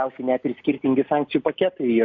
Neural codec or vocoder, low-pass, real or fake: none; 7.2 kHz; real